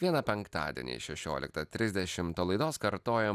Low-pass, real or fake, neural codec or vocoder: 14.4 kHz; real; none